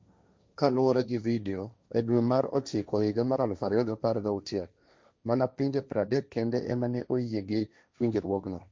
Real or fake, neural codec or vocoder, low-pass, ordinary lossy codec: fake; codec, 16 kHz, 1.1 kbps, Voila-Tokenizer; none; none